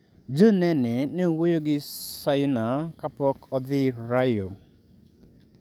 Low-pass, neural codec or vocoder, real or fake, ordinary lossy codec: none; codec, 44.1 kHz, 7.8 kbps, DAC; fake; none